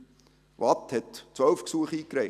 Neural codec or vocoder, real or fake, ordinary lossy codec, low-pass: none; real; none; 14.4 kHz